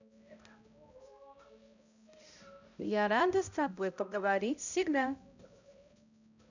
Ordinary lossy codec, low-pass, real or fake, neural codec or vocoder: none; 7.2 kHz; fake; codec, 16 kHz, 0.5 kbps, X-Codec, HuBERT features, trained on balanced general audio